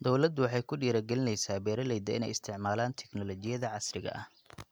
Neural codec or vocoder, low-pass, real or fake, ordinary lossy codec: none; none; real; none